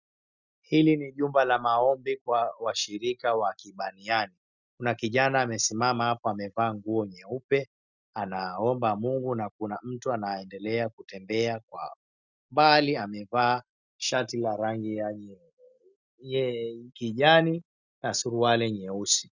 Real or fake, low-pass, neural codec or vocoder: real; 7.2 kHz; none